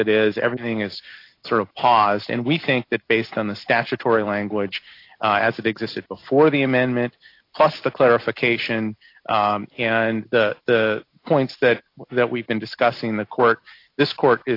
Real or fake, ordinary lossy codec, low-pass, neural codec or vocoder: real; AAC, 32 kbps; 5.4 kHz; none